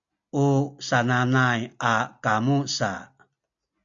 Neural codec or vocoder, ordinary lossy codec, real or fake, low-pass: none; AAC, 64 kbps; real; 7.2 kHz